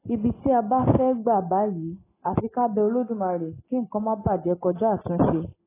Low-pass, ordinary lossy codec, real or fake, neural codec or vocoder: 3.6 kHz; AAC, 16 kbps; real; none